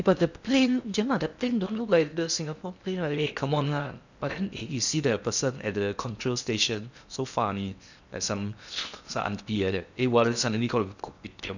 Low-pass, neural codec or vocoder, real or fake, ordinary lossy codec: 7.2 kHz; codec, 16 kHz in and 24 kHz out, 0.8 kbps, FocalCodec, streaming, 65536 codes; fake; none